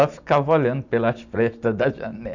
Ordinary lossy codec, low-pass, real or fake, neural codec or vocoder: none; 7.2 kHz; real; none